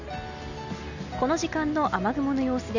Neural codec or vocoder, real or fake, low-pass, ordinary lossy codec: none; real; 7.2 kHz; none